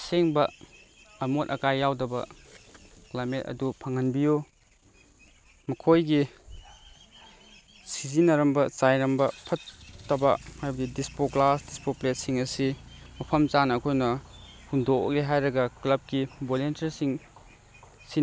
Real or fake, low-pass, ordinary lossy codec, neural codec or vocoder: real; none; none; none